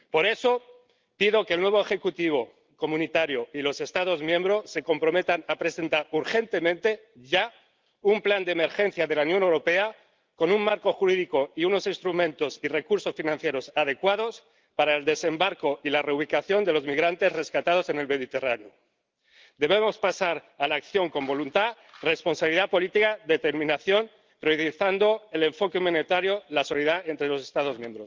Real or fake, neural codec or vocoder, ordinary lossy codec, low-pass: real; none; Opus, 32 kbps; 7.2 kHz